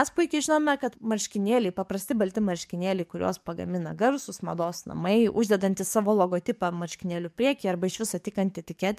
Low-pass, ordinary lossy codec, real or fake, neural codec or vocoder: 14.4 kHz; MP3, 96 kbps; fake; codec, 44.1 kHz, 7.8 kbps, DAC